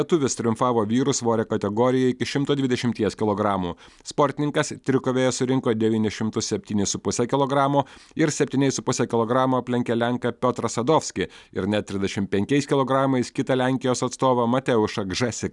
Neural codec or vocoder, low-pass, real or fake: none; 10.8 kHz; real